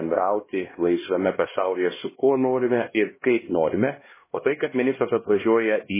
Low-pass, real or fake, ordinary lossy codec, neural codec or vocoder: 3.6 kHz; fake; MP3, 16 kbps; codec, 16 kHz, 1 kbps, X-Codec, HuBERT features, trained on LibriSpeech